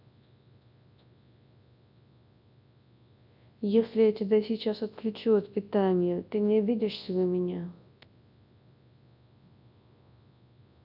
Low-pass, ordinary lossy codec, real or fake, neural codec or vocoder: 5.4 kHz; none; fake; codec, 24 kHz, 0.9 kbps, WavTokenizer, large speech release